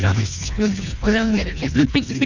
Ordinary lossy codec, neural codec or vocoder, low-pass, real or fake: none; codec, 24 kHz, 1.5 kbps, HILCodec; 7.2 kHz; fake